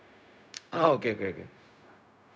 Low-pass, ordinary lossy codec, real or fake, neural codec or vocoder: none; none; fake; codec, 16 kHz, 0.4 kbps, LongCat-Audio-Codec